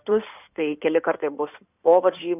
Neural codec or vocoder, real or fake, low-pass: codec, 16 kHz in and 24 kHz out, 2.2 kbps, FireRedTTS-2 codec; fake; 3.6 kHz